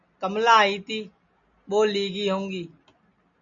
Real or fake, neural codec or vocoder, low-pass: real; none; 7.2 kHz